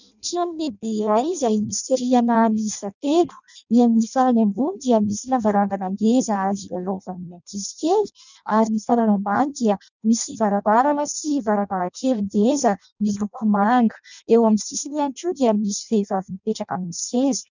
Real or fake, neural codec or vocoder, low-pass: fake; codec, 16 kHz in and 24 kHz out, 0.6 kbps, FireRedTTS-2 codec; 7.2 kHz